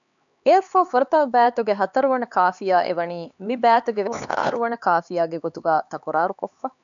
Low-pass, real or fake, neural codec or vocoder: 7.2 kHz; fake; codec, 16 kHz, 4 kbps, X-Codec, HuBERT features, trained on LibriSpeech